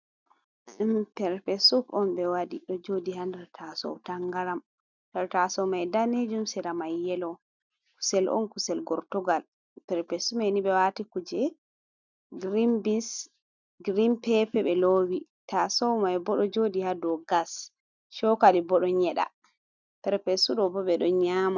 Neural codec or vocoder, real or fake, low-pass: none; real; 7.2 kHz